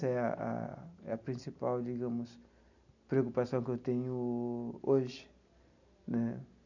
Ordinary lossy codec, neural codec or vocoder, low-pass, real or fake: none; none; 7.2 kHz; real